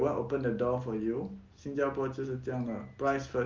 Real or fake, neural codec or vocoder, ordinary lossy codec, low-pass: real; none; Opus, 24 kbps; 7.2 kHz